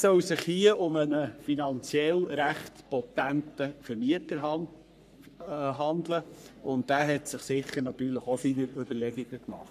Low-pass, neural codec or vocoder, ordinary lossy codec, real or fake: 14.4 kHz; codec, 44.1 kHz, 3.4 kbps, Pupu-Codec; none; fake